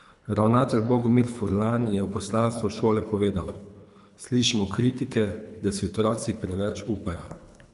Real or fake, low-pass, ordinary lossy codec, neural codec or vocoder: fake; 10.8 kHz; none; codec, 24 kHz, 3 kbps, HILCodec